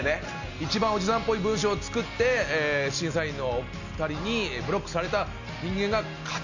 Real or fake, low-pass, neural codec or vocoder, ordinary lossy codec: real; 7.2 kHz; none; none